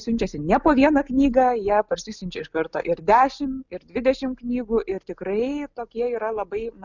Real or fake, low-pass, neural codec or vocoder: real; 7.2 kHz; none